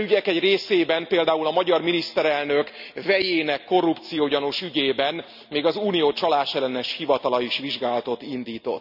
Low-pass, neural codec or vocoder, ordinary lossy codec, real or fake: 5.4 kHz; none; none; real